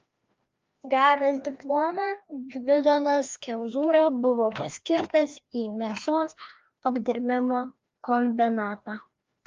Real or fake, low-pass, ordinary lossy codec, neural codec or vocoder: fake; 7.2 kHz; Opus, 32 kbps; codec, 16 kHz, 1 kbps, FreqCodec, larger model